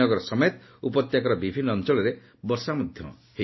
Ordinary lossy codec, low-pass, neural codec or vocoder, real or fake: MP3, 24 kbps; 7.2 kHz; none; real